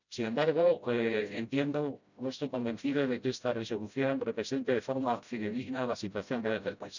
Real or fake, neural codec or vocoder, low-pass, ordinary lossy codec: fake; codec, 16 kHz, 0.5 kbps, FreqCodec, smaller model; 7.2 kHz; none